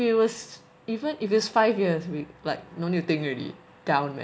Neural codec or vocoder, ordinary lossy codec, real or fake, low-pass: none; none; real; none